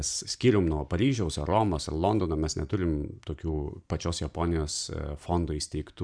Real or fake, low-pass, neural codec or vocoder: fake; 9.9 kHz; vocoder, 44.1 kHz, 128 mel bands every 512 samples, BigVGAN v2